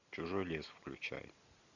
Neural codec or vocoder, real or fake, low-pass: none; real; 7.2 kHz